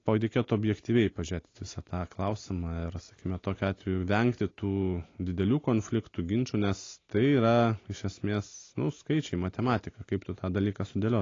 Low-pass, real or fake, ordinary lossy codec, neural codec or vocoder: 7.2 kHz; real; AAC, 32 kbps; none